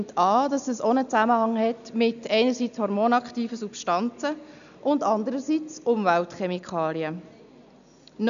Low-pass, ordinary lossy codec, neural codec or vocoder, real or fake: 7.2 kHz; none; none; real